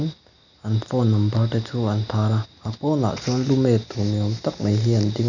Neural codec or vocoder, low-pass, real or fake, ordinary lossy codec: none; 7.2 kHz; real; none